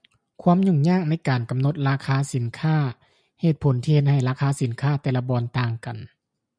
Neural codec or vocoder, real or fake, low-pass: none; real; 9.9 kHz